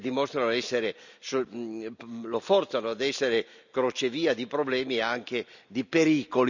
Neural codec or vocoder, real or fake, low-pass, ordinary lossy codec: none; real; 7.2 kHz; none